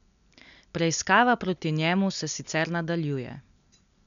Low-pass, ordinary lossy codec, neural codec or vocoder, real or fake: 7.2 kHz; none; none; real